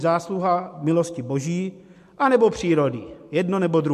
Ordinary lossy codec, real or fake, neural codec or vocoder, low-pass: MP3, 64 kbps; real; none; 14.4 kHz